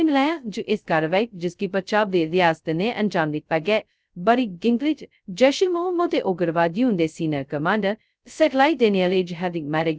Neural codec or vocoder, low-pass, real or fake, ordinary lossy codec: codec, 16 kHz, 0.2 kbps, FocalCodec; none; fake; none